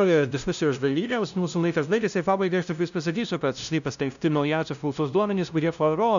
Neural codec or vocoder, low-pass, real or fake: codec, 16 kHz, 0.5 kbps, FunCodec, trained on LibriTTS, 25 frames a second; 7.2 kHz; fake